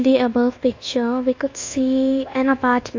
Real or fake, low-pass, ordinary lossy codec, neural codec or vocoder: fake; 7.2 kHz; AAC, 48 kbps; codec, 24 kHz, 1.2 kbps, DualCodec